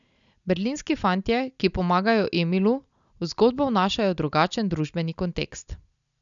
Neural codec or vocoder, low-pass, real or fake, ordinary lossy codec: none; 7.2 kHz; real; none